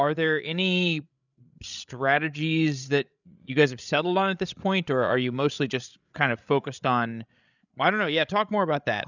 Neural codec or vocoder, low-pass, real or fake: codec, 16 kHz, 16 kbps, FreqCodec, larger model; 7.2 kHz; fake